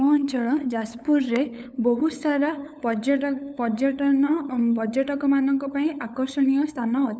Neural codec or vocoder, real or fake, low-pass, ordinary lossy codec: codec, 16 kHz, 8 kbps, FunCodec, trained on LibriTTS, 25 frames a second; fake; none; none